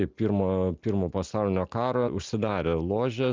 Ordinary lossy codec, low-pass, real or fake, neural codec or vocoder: Opus, 24 kbps; 7.2 kHz; real; none